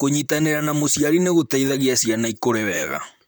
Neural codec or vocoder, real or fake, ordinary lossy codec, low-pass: vocoder, 44.1 kHz, 128 mel bands, Pupu-Vocoder; fake; none; none